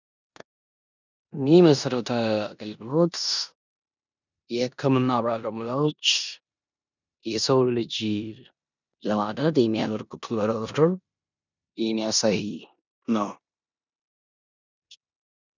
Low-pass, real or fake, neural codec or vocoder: 7.2 kHz; fake; codec, 16 kHz in and 24 kHz out, 0.9 kbps, LongCat-Audio-Codec, four codebook decoder